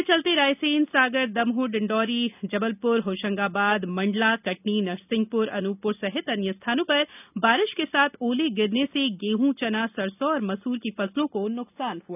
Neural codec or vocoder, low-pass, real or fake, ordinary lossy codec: none; 3.6 kHz; real; none